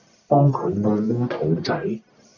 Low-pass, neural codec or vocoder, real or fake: 7.2 kHz; codec, 44.1 kHz, 1.7 kbps, Pupu-Codec; fake